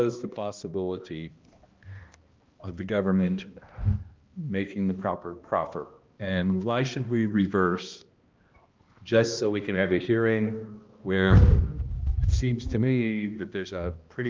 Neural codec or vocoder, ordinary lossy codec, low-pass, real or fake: codec, 16 kHz, 1 kbps, X-Codec, HuBERT features, trained on balanced general audio; Opus, 24 kbps; 7.2 kHz; fake